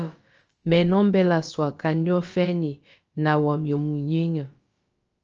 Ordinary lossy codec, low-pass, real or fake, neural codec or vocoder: Opus, 24 kbps; 7.2 kHz; fake; codec, 16 kHz, about 1 kbps, DyCAST, with the encoder's durations